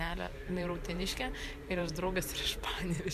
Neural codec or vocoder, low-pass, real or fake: vocoder, 44.1 kHz, 128 mel bands every 512 samples, BigVGAN v2; 14.4 kHz; fake